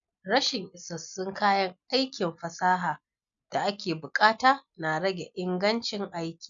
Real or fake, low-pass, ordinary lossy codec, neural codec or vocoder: real; 7.2 kHz; none; none